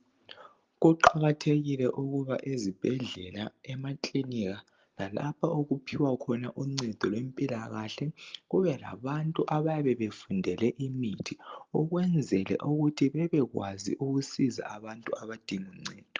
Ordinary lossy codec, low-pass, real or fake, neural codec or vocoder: Opus, 24 kbps; 7.2 kHz; real; none